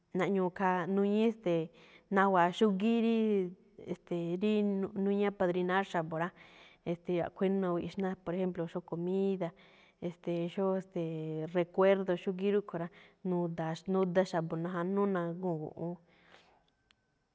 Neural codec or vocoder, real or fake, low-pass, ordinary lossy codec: none; real; none; none